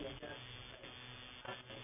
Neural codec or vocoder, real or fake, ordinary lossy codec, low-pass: none; real; none; 3.6 kHz